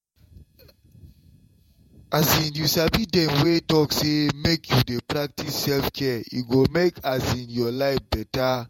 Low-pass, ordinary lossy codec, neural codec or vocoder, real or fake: 19.8 kHz; MP3, 64 kbps; vocoder, 48 kHz, 128 mel bands, Vocos; fake